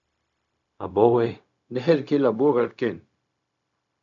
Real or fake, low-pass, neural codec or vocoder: fake; 7.2 kHz; codec, 16 kHz, 0.4 kbps, LongCat-Audio-Codec